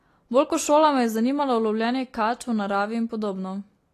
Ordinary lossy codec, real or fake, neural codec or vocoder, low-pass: AAC, 48 kbps; real; none; 14.4 kHz